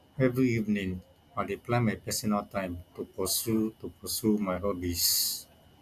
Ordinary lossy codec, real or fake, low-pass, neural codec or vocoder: none; real; 14.4 kHz; none